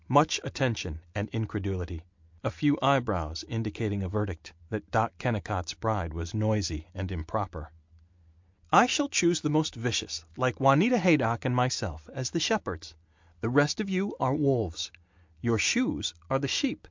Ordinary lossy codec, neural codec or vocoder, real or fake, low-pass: MP3, 64 kbps; none; real; 7.2 kHz